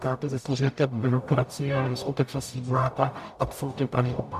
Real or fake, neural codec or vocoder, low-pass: fake; codec, 44.1 kHz, 0.9 kbps, DAC; 14.4 kHz